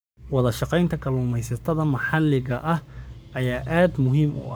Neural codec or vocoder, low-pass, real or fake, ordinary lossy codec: codec, 44.1 kHz, 7.8 kbps, Pupu-Codec; none; fake; none